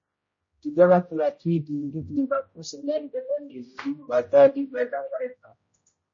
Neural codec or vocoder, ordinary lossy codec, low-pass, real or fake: codec, 16 kHz, 0.5 kbps, X-Codec, HuBERT features, trained on general audio; MP3, 32 kbps; 7.2 kHz; fake